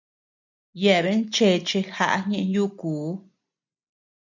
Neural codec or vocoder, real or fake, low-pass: none; real; 7.2 kHz